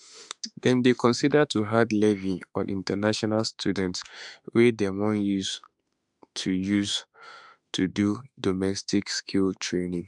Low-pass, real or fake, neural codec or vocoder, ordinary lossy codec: 10.8 kHz; fake; autoencoder, 48 kHz, 32 numbers a frame, DAC-VAE, trained on Japanese speech; none